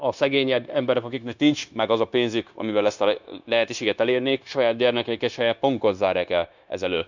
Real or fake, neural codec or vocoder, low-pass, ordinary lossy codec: fake; codec, 16 kHz, 0.9 kbps, LongCat-Audio-Codec; 7.2 kHz; none